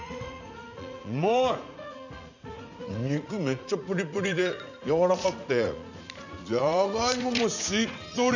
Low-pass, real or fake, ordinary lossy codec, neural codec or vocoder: 7.2 kHz; fake; none; vocoder, 44.1 kHz, 80 mel bands, Vocos